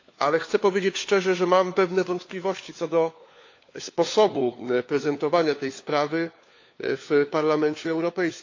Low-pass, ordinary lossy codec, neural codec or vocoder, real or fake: 7.2 kHz; AAC, 48 kbps; codec, 16 kHz, 4 kbps, FunCodec, trained on LibriTTS, 50 frames a second; fake